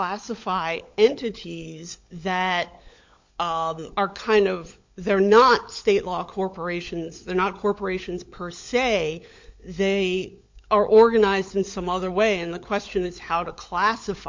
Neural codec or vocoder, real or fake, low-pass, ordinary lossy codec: codec, 16 kHz, 16 kbps, FunCodec, trained on LibriTTS, 50 frames a second; fake; 7.2 kHz; MP3, 48 kbps